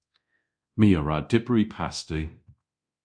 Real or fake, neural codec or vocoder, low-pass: fake; codec, 24 kHz, 0.9 kbps, DualCodec; 9.9 kHz